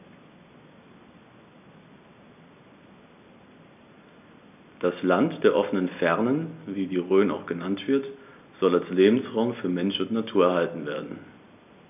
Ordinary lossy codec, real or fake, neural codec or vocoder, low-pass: none; real; none; 3.6 kHz